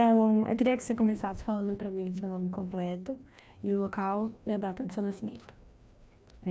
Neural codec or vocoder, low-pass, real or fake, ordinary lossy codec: codec, 16 kHz, 1 kbps, FreqCodec, larger model; none; fake; none